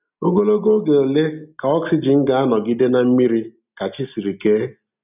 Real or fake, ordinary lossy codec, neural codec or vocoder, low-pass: real; none; none; 3.6 kHz